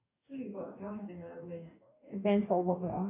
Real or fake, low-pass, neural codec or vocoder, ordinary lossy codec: fake; 3.6 kHz; codec, 44.1 kHz, 2.6 kbps, DAC; AAC, 24 kbps